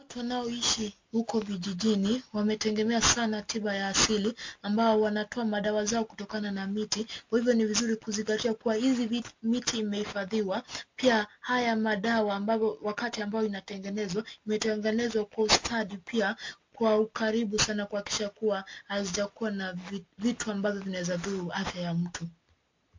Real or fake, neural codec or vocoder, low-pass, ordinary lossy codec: real; none; 7.2 kHz; MP3, 48 kbps